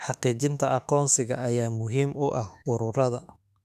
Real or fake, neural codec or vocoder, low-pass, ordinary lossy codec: fake; autoencoder, 48 kHz, 32 numbers a frame, DAC-VAE, trained on Japanese speech; 14.4 kHz; none